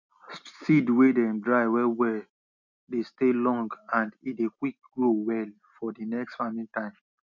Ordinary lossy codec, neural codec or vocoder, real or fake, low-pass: none; none; real; 7.2 kHz